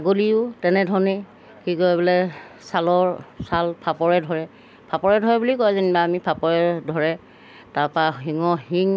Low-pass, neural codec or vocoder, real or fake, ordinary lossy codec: none; none; real; none